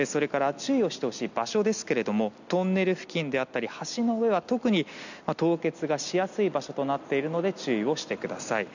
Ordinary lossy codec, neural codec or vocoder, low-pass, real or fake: none; none; 7.2 kHz; real